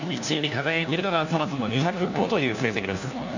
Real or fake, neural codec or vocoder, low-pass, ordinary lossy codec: fake; codec, 16 kHz, 1 kbps, FunCodec, trained on LibriTTS, 50 frames a second; 7.2 kHz; none